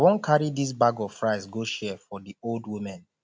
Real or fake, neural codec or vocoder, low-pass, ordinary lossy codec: real; none; none; none